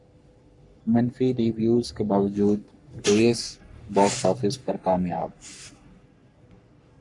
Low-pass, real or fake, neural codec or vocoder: 10.8 kHz; fake; codec, 44.1 kHz, 3.4 kbps, Pupu-Codec